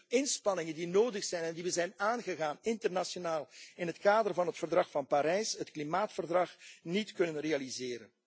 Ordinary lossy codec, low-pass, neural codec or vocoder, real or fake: none; none; none; real